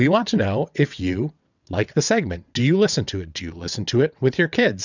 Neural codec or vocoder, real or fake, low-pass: vocoder, 22.05 kHz, 80 mel bands, WaveNeXt; fake; 7.2 kHz